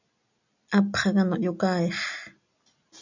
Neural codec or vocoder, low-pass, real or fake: none; 7.2 kHz; real